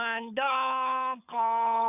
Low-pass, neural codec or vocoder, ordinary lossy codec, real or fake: 3.6 kHz; codec, 24 kHz, 6 kbps, HILCodec; none; fake